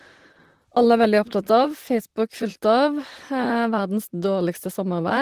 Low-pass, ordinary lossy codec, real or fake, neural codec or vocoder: 14.4 kHz; Opus, 16 kbps; fake; vocoder, 44.1 kHz, 128 mel bands, Pupu-Vocoder